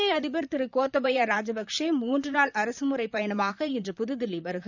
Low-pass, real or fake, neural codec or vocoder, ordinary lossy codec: 7.2 kHz; fake; vocoder, 44.1 kHz, 128 mel bands, Pupu-Vocoder; none